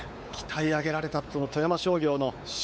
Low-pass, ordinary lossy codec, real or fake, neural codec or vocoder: none; none; fake; codec, 16 kHz, 4 kbps, X-Codec, WavLM features, trained on Multilingual LibriSpeech